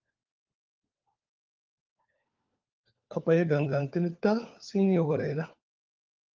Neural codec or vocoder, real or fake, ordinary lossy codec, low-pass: codec, 16 kHz, 4 kbps, FunCodec, trained on LibriTTS, 50 frames a second; fake; Opus, 32 kbps; 7.2 kHz